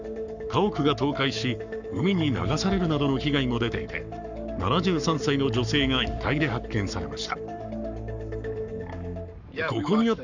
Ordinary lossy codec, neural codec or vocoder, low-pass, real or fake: none; codec, 44.1 kHz, 7.8 kbps, Pupu-Codec; 7.2 kHz; fake